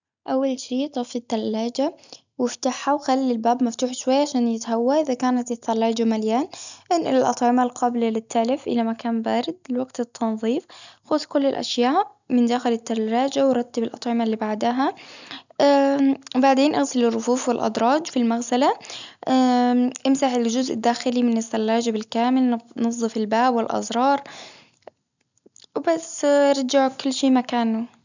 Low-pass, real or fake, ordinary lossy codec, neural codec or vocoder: 7.2 kHz; real; none; none